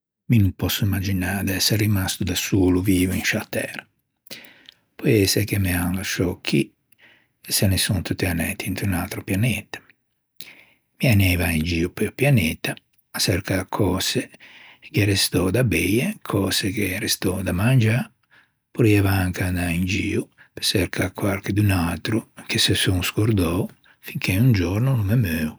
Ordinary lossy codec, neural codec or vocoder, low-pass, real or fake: none; none; none; real